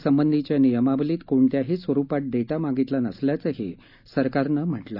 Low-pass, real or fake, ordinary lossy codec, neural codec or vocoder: 5.4 kHz; real; none; none